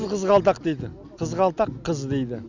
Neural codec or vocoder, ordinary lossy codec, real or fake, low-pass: none; none; real; 7.2 kHz